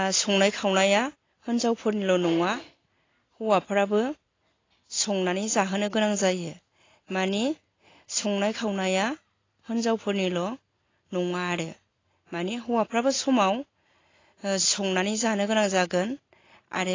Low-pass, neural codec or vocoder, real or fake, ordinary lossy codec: 7.2 kHz; none; real; AAC, 32 kbps